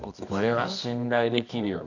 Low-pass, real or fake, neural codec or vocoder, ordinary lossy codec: 7.2 kHz; fake; codec, 24 kHz, 0.9 kbps, WavTokenizer, medium music audio release; none